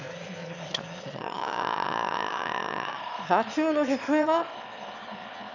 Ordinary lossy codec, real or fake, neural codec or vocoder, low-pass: none; fake; autoencoder, 22.05 kHz, a latent of 192 numbers a frame, VITS, trained on one speaker; 7.2 kHz